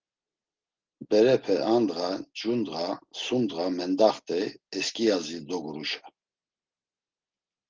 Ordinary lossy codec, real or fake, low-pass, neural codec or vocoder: Opus, 16 kbps; real; 7.2 kHz; none